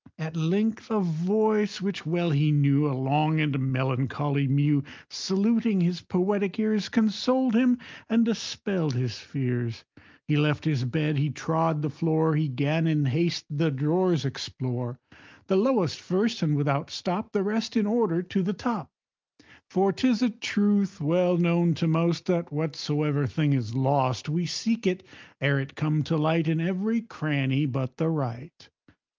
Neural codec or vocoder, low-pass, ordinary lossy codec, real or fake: none; 7.2 kHz; Opus, 24 kbps; real